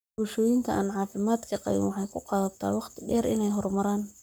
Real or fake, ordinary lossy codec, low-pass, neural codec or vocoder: fake; none; none; codec, 44.1 kHz, 7.8 kbps, Pupu-Codec